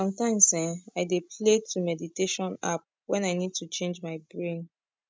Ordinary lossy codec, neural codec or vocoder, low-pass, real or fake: none; none; none; real